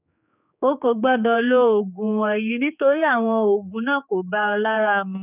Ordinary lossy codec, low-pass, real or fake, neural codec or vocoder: none; 3.6 kHz; fake; codec, 16 kHz, 4 kbps, X-Codec, HuBERT features, trained on general audio